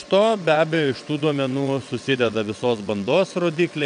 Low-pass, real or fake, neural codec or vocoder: 9.9 kHz; fake; vocoder, 22.05 kHz, 80 mel bands, WaveNeXt